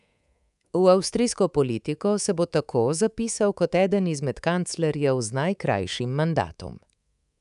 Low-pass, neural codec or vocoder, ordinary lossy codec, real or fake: 10.8 kHz; codec, 24 kHz, 3.1 kbps, DualCodec; none; fake